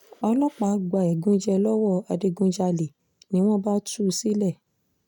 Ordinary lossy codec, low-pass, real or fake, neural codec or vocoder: none; 19.8 kHz; real; none